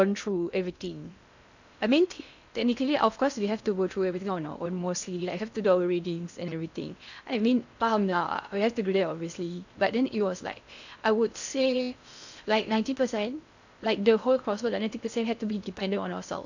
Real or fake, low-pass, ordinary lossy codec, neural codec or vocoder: fake; 7.2 kHz; none; codec, 16 kHz in and 24 kHz out, 0.6 kbps, FocalCodec, streaming, 2048 codes